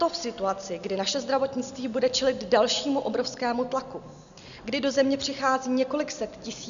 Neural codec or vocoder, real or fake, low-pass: none; real; 7.2 kHz